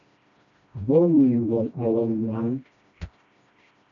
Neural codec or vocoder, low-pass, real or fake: codec, 16 kHz, 1 kbps, FreqCodec, smaller model; 7.2 kHz; fake